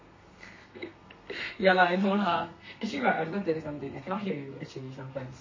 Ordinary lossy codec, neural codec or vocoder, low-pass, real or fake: MP3, 32 kbps; codec, 32 kHz, 1.9 kbps, SNAC; 7.2 kHz; fake